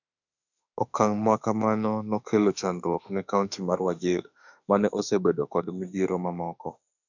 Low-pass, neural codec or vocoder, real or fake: 7.2 kHz; autoencoder, 48 kHz, 32 numbers a frame, DAC-VAE, trained on Japanese speech; fake